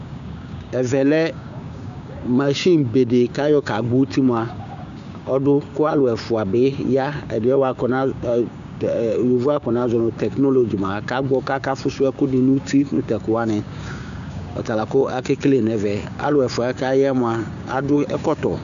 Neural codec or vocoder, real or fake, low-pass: codec, 16 kHz, 6 kbps, DAC; fake; 7.2 kHz